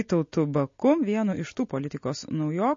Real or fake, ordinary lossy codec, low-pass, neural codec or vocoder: real; MP3, 32 kbps; 7.2 kHz; none